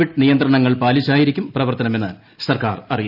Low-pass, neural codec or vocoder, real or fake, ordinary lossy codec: 5.4 kHz; none; real; none